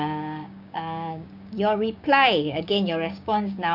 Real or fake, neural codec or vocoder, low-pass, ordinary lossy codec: fake; codec, 16 kHz, 6 kbps, DAC; 5.4 kHz; none